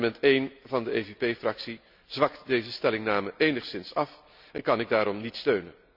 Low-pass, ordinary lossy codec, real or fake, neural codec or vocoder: 5.4 kHz; none; real; none